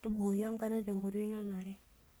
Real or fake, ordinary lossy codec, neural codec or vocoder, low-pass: fake; none; codec, 44.1 kHz, 1.7 kbps, Pupu-Codec; none